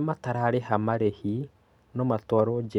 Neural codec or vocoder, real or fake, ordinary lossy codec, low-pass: none; real; none; 19.8 kHz